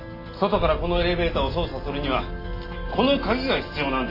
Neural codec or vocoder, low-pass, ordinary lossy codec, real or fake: none; 5.4 kHz; AAC, 24 kbps; real